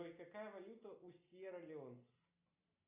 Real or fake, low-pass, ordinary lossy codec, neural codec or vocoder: real; 3.6 kHz; AAC, 32 kbps; none